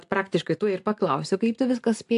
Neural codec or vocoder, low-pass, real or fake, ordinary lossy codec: none; 10.8 kHz; real; AAC, 64 kbps